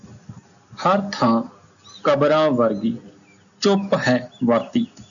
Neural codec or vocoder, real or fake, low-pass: none; real; 7.2 kHz